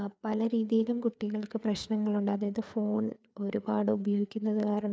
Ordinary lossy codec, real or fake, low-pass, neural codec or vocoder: none; fake; none; codec, 16 kHz, 4 kbps, FreqCodec, larger model